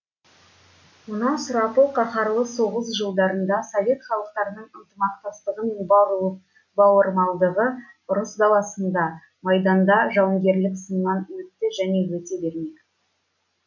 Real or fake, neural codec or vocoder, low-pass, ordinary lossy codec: real; none; 7.2 kHz; MP3, 64 kbps